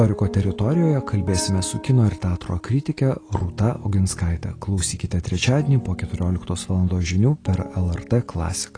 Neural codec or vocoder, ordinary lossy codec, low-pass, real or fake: none; AAC, 32 kbps; 9.9 kHz; real